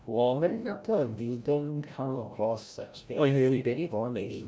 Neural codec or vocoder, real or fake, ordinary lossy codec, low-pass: codec, 16 kHz, 0.5 kbps, FreqCodec, larger model; fake; none; none